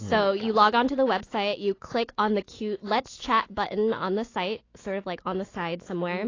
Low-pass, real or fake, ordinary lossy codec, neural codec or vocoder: 7.2 kHz; fake; AAC, 32 kbps; autoencoder, 48 kHz, 128 numbers a frame, DAC-VAE, trained on Japanese speech